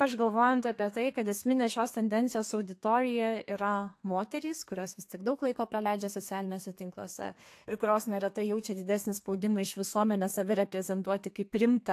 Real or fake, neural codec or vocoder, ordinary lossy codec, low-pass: fake; codec, 32 kHz, 1.9 kbps, SNAC; AAC, 64 kbps; 14.4 kHz